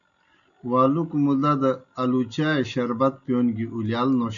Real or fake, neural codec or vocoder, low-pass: real; none; 7.2 kHz